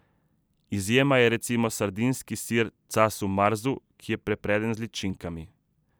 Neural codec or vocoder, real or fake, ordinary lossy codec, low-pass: vocoder, 44.1 kHz, 128 mel bands every 256 samples, BigVGAN v2; fake; none; none